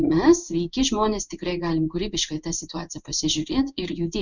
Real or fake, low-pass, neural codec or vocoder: fake; 7.2 kHz; codec, 16 kHz in and 24 kHz out, 1 kbps, XY-Tokenizer